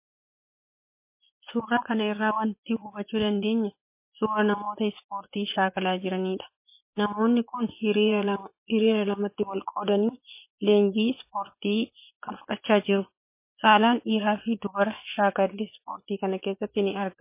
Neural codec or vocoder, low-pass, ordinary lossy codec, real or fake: vocoder, 24 kHz, 100 mel bands, Vocos; 3.6 kHz; MP3, 24 kbps; fake